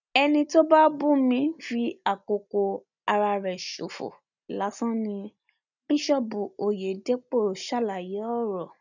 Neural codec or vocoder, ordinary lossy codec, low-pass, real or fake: none; none; 7.2 kHz; real